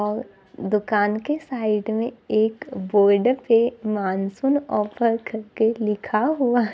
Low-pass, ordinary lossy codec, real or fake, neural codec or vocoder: none; none; real; none